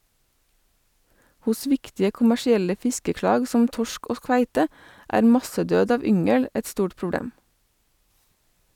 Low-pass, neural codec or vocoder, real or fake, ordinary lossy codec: 19.8 kHz; none; real; none